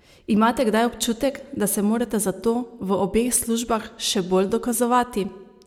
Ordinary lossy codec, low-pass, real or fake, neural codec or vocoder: none; 19.8 kHz; real; none